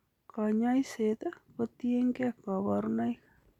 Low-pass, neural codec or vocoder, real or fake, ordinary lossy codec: 19.8 kHz; none; real; none